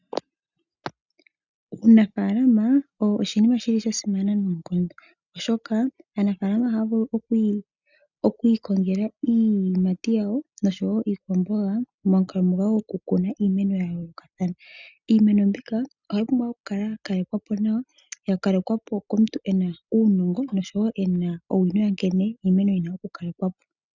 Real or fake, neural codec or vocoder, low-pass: real; none; 7.2 kHz